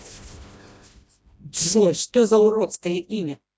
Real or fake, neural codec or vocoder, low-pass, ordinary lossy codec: fake; codec, 16 kHz, 1 kbps, FreqCodec, smaller model; none; none